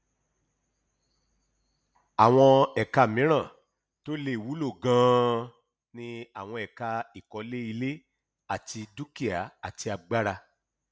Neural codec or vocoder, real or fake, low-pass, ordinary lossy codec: none; real; none; none